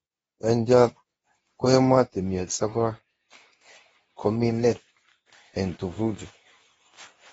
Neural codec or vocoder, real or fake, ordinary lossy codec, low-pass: codec, 24 kHz, 0.9 kbps, WavTokenizer, medium speech release version 2; fake; AAC, 24 kbps; 10.8 kHz